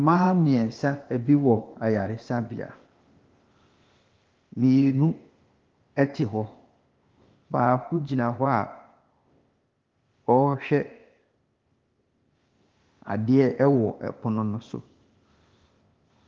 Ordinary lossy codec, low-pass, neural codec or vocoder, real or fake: Opus, 32 kbps; 7.2 kHz; codec, 16 kHz, 0.8 kbps, ZipCodec; fake